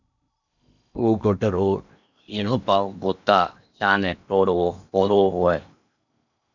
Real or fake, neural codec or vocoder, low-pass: fake; codec, 16 kHz in and 24 kHz out, 0.8 kbps, FocalCodec, streaming, 65536 codes; 7.2 kHz